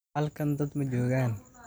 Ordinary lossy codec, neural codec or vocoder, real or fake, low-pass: none; none; real; none